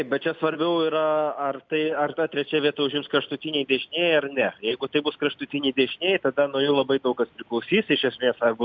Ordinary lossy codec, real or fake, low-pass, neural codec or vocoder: MP3, 64 kbps; real; 7.2 kHz; none